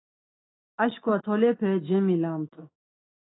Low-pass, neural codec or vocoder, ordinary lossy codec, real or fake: 7.2 kHz; autoencoder, 48 kHz, 128 numbers a frame, DAC-VAE, trained on Japanese speech; AAC, 16 kbps; fake